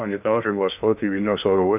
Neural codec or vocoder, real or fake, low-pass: codec, 16 kHz in and 24 kHz out, 0.6 kbps, FocalCodec, streaming, 2048 codes; fake; 3.6 kHz